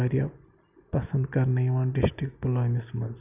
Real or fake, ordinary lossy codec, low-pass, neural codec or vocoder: real; none; 3.6 kHz; none